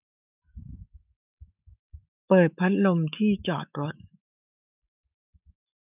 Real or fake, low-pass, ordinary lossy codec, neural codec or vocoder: fake; 3.6 kHz; none; autoencoder, 48 kHz, 128 numbers a frame, DAC-VAE, trained on Japanese speech